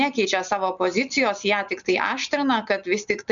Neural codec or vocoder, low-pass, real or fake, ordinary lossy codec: none; 7.2 kHz; real; MP3, 96 kbps